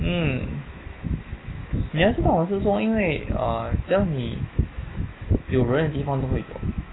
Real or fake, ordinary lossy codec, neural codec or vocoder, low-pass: fake; AAC, 16 kbps; autoencoder, 48 kHz, 128 numbers a frame, DAC-VAE, trained on Japanese speech; 7.2 kHz